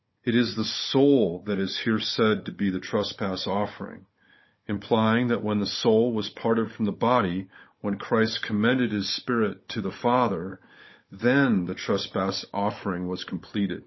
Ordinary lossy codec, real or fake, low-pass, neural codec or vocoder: MP3, 24 kbps; fake; 7.2 kHz; codec, 16 kHz, 16 kbps, FunCodec, trained on Chinese and English, 50 frames a second